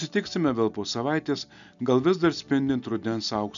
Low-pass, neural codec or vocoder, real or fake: 7.2 kHz; none; real